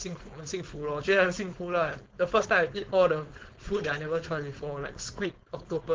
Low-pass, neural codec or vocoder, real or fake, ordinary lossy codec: 7.2 kHz; codec, 16 kHz, 4.8 kbps, FACodec; fake; Opus, 16 kbps